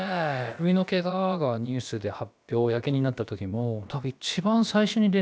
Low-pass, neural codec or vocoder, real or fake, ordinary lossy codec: none; codec, 16 kHz, about 1 kbps, DyCAST, with the encoder's durations; fake; none